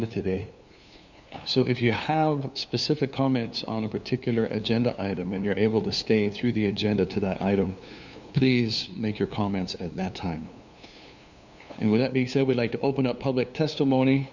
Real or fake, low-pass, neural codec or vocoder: fake; 7.2 kHz; codec, 16 kHz, 2 kbps, FunCodec, trained on LibriTTS, 25 frames a second